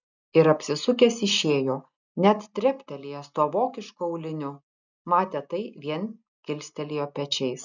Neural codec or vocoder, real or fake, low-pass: none; real; 7.2 kHz